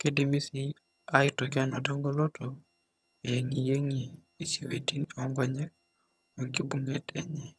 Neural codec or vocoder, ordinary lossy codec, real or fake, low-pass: vocoder, 22.05 kHz, 80 mel bands, HiFi-GAN; none; fake; none